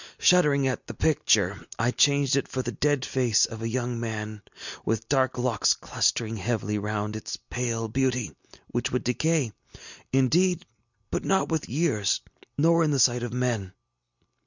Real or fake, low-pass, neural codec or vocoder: real; 7.2 kHz; none